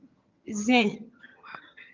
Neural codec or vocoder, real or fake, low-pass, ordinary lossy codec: vocoder, 22.05 kHz, 80 mel bands, HiFi-GAN; fake; 7.2 kHz; Opus, 32 kbps